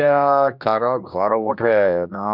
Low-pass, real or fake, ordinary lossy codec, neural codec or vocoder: 5.4 kHz; fake; none; codec, 16 kHz, 1 kbps, X-Codec, HuBERT features, trained on general audio